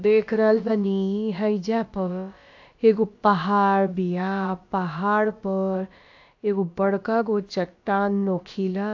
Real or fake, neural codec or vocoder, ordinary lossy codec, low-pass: fake; codec, 16 kHz, about 1 kbps, DyCAST, with the encoder's durations; AAC, 48 kbps; 7.2 kHz